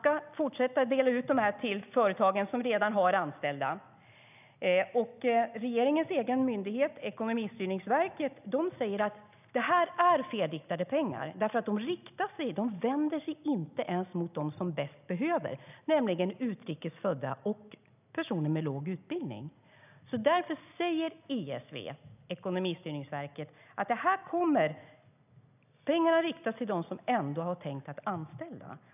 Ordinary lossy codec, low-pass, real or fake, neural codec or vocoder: none; 3.6 kHz; real; none